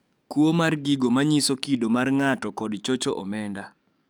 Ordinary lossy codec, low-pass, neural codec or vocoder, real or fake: none; none; codec, 44.1 kHz, 7.8 kbps, DAC; fake